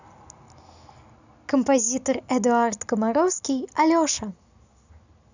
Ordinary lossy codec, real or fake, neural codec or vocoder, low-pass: none; real; none; 7.2 kHz